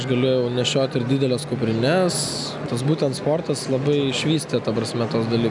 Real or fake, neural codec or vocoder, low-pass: real; none; 10.8 kHz